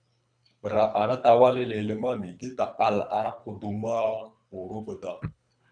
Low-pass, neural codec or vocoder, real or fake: 9.9 kHz; codec, 24 kHz, 3 kbps, HILCodec; fake